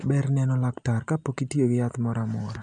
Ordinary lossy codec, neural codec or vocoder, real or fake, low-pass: none; none; real; 9.9 kHz